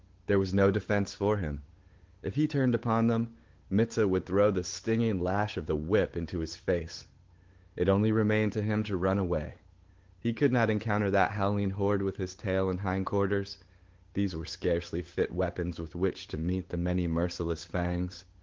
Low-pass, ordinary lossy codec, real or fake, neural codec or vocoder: 7.2 kHz; Opus, 16 kbps; fake; codec, 16 kHz, 8 kbps, FunCodec, trained on Chinese and English, 25 frames a second